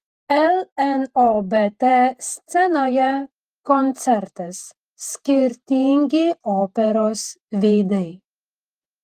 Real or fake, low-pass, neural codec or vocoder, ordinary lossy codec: fake; 14.4 kHz; vocoder, 48 kHz, 128 mel bands, Vocos; Opus, 24 kbps